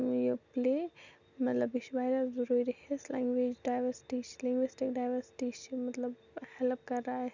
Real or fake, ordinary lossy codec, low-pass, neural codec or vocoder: real; none; 7.2 kHz; none